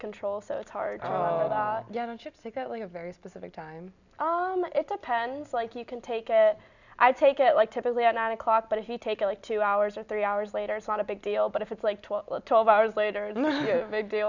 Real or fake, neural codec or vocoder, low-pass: real; none; 7.2 kHz